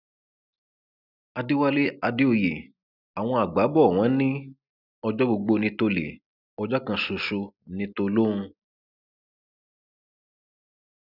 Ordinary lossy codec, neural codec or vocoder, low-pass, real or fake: none; none; 5.4 kHz; real